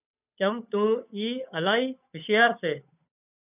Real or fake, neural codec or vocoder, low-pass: fake; codec, 16 kHz, 8 kbps, FunCodec, trained on Chinese and English, 25 frames a second; 3.6 kHz